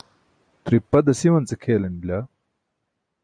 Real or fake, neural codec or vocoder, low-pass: real; none; 9.9 kHz